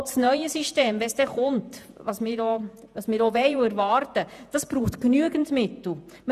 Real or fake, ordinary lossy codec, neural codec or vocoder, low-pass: fake; none; vocoder, 48 kHz, 128 mel bands, Vocos; 14.4 kHz